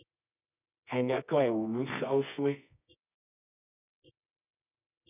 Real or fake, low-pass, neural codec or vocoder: fake; 3.6 kHz; codec, 24 kHz, 0.9 kbps, WavTokenizer, medium music audio release